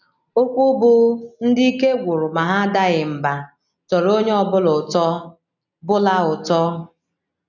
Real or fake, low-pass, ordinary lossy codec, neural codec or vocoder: real; 7.2 kHz; none; none